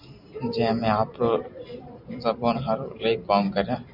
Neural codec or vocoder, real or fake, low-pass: vocoder, 44.1 kHz, 128 mel bands every 512 samples, BigVGAN v2; fake; 5.4 kHz